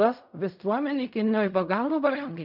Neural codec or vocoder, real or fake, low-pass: codec, 16 kHz in and 24 kHz out, 0.4 kbps, LongCat-Audio-Codec, fine tuned four codebook decoder; fake; 5.4 kHz